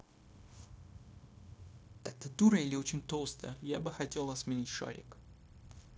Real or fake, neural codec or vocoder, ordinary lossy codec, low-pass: fake; codec, 16 kHz, 0.9 kbps, LongCat-Audio-Codec; none; none